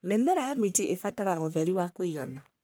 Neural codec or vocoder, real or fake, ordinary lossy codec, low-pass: codec, 44.1 kHz, 1.7 kbps, Pupu-Codec; fake; none; none